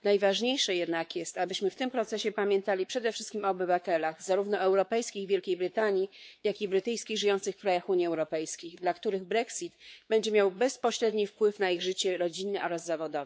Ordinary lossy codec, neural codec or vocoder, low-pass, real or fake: none; codec, 16 kHz, 4 kbps, X-Codec, WavLM features, trained on Multilingual LibriSpeech; none; fake